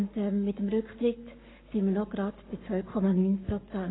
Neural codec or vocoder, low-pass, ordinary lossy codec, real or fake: codec, 44.1 kHz, 7.8 kbps, Pupu-Codec; 7.2 kHz; AAC, 16 kbps; fake